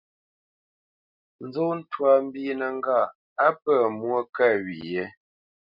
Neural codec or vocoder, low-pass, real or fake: none; 5.4 kHz; real